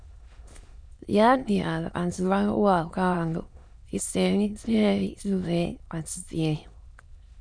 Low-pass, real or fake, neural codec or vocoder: 9.9 kHz; fake; autoencoder, 22.05 kHz, a latent of 192 numbers a frame, VITS, trained on many speakers